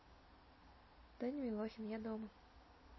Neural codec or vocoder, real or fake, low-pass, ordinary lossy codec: none; real; 7.2 kHz; MP3, 24 kbps